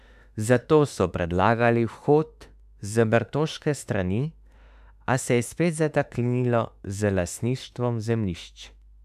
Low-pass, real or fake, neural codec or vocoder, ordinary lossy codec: 14.4 kHz; fake; autoencoder, 48 kHz, 32 numbers a frame, DAC-VAE, trained on Japanese speech; none